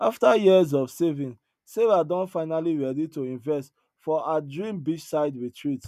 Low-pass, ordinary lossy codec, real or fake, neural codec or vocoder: 14.4 kHz; none; real; none